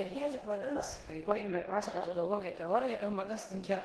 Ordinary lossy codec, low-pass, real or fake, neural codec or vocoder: Opus, 16 kbps; 10.8 kHz; fake; codec, 16 kHz in and 24 kHz out, 0.9 kbps, LongCat-Audio-Codec, four codebook decoder